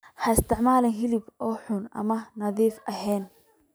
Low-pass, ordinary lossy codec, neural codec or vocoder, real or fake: none; none; none; real